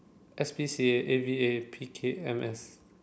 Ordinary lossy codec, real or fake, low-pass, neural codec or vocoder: none; real; none; none